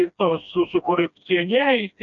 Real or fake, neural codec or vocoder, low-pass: fake; codec, 16 kHz, 1 kbps, FreqCodec, smaller model; 7.2 kHz